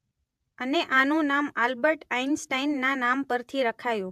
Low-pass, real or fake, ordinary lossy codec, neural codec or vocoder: 14.4 kHz; fake; AAC, 96 kbps; vocoder, 48 kHz, 128 mel bands, Vocos